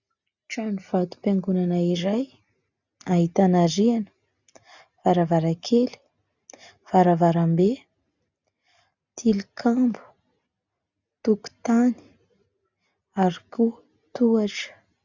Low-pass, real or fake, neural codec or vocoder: 7.2 kHz; real; none